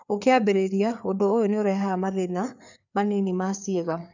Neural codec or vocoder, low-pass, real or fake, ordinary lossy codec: codec, 16 kHz, 4 kbps, FreqCodec, larger model; 7.2 kHz; fake; none